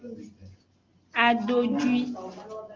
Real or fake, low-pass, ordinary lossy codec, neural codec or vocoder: real; 7.2 kHz; Opus, 32 kbps; none